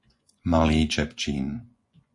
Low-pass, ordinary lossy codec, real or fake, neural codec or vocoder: 10.8 kHz; AAC, 48 kbps; fake; vocoder, 24 kHz, 100 mel bands, Vocos